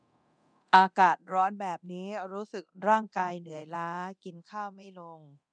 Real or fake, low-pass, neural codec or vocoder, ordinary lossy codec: fake; 9.9 kHz; codec, 24 kHz, 0.9 kbps, DualCodec; none